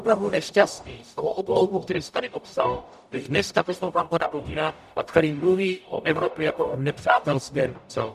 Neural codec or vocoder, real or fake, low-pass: codec, 44.1 kHz, 0.9 kbps, DAC; fake; 14.4 kHz